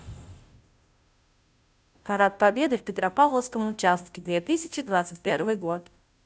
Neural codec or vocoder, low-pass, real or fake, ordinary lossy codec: codec, 16 kHz, 0.5 kbps, FunCodec, trained on Chinese and English, 25 frames a second; none; fake; none